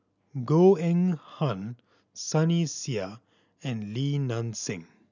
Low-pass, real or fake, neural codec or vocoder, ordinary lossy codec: 7.2 kHz; real; none; none